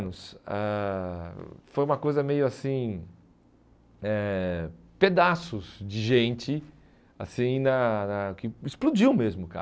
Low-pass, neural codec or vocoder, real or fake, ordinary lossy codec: none; none; real; none